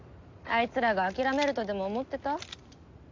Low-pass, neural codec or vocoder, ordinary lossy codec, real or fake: 7.2 kHz; none; MP3, 64 kbps; real